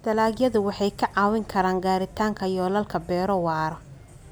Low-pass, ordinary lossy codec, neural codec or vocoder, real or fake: none; none; none; real